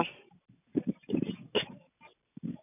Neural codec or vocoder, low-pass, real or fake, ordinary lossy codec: none; 3.6 kHz; real; none